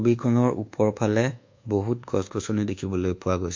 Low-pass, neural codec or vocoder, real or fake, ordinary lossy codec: 7.2 kHz; codec, 24 kHz, 1.2 kbps, DualCodec; fake; MP3, 48 kbps